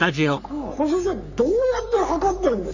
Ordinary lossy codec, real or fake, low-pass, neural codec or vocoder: none; fake; 7.2 kHz; codec, 44.1 kHz, 3.4 kbps, Pupu-Codec